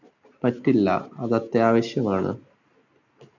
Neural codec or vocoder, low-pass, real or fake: none; 7.2 kHz; real